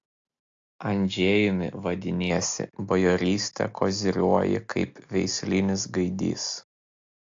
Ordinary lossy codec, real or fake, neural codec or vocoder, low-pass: AAC, 48 kbps; real; none; 7.2 kHz